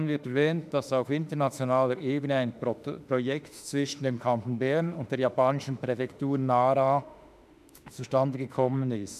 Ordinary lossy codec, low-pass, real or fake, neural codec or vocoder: none; 14.4 kHz; fake; autoencoder, 48 kHz, 32 numbers a frame, DAC-VAE, trained on Japanese speech